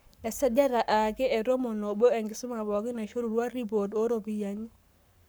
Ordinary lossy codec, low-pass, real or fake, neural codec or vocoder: none; none; fake; codec, 44.1 kHz, 7.8 kbps, Pupu-Codec